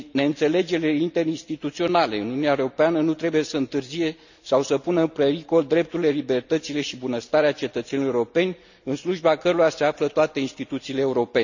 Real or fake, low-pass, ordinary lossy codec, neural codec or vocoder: real; 7.2 kHz; none; none